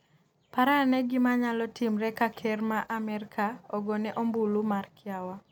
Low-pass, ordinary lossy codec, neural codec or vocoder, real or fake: 19.8 kHz; none; none; real